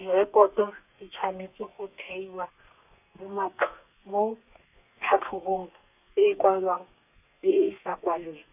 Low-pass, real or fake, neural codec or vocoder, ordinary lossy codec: 3.6 kHz; fake; codec, 32 kHz, 1.9 kbps, SNAC; none